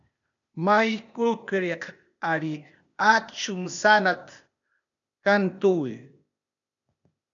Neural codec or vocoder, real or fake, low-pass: codec, 16 kHz, 0.8 kbps, ZipCodec; fake; 7.2 kHz